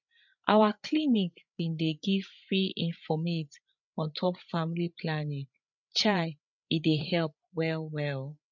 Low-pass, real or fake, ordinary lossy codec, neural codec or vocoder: 7.2 kHz; fake; none; codec, 16 kHz, 8 kbps, FreqCodec, larger model